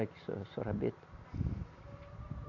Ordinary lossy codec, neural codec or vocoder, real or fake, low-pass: none; none; real; 7.2 kHz